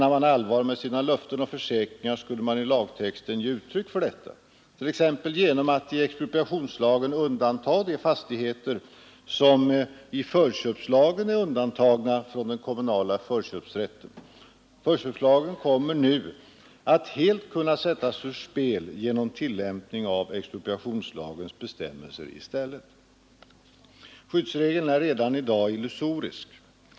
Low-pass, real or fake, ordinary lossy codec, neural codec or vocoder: none; real; none; none